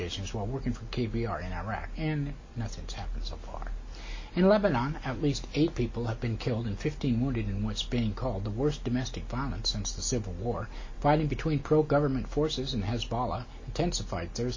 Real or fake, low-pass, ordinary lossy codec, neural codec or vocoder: real; 7.2 kHz; MP3, 32 kbps; none